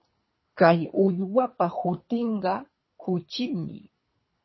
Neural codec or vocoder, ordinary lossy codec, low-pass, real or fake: codec, 24 kHz, 3 kbps, HILCodec; MP3, 24 kbps; 7.2 kHz; fake